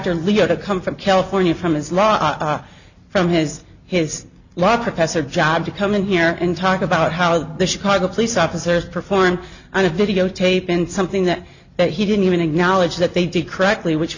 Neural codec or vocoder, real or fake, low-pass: none; real; 7.2 kHz